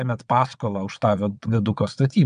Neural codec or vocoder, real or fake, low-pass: vocoder, 22.05 kHz, 80 mel bands, WaveNeXt; fake; 9.9 kHz